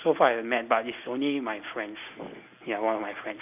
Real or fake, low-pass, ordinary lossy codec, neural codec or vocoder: real; 3.6 kHz; none; none